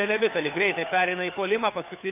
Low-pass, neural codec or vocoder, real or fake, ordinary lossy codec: 3.6 kHz; codec, 16 kHz, 4 kbps, FunCodec, trained on LibriTTS, 50 frames a second; fake; MP3, 32 kbps